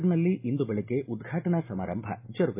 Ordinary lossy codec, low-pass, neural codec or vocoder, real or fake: MP3, 24 kbps; 3.6 kHz; none; real